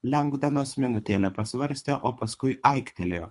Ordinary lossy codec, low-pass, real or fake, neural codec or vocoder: MP3, 64 kbps; 10.8 kHz; fake; codec, 24 kHz, 3 kbps, HILCodec